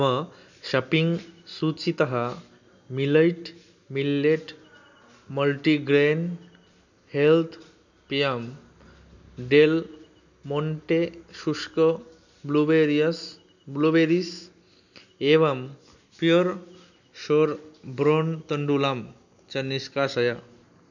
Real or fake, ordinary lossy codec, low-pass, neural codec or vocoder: real; none; 7.2 kHz; none